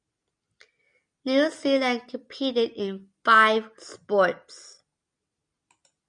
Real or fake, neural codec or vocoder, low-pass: real; none; 9.9 kHz